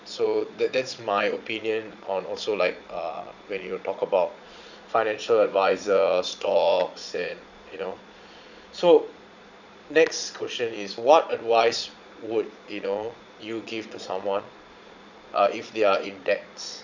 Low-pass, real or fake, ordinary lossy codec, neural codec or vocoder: 7.2 kHz; fake; none; vocoder, 22.05 kHz, 80 mel bands, Vocos